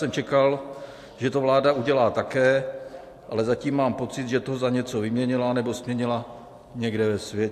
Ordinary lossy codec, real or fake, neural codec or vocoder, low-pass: AAC, 64 kbps; real; none; 14.4 kHz